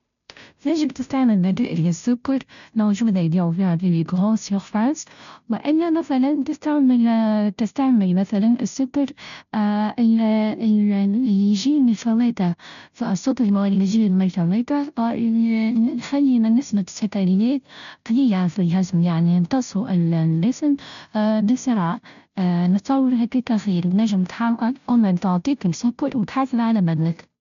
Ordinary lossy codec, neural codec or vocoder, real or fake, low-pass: none; codec, 16 kHz, 0.5 kbps, FunCodec, trained on Chinese and English, 25 frames a second; fake; 7.2 kHz